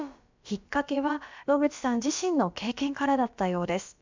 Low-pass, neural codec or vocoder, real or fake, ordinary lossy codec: 7.2 kHz; codec, 16 kHz, about 1 kbps, DyCAST, with the encoder's durations; fake; MP3, 64 kbps